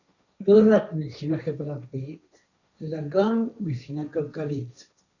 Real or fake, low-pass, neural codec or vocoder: fake; 7.2 kHz; codec, 16 kHz, 1.1 kbps, Voila-Tokenizer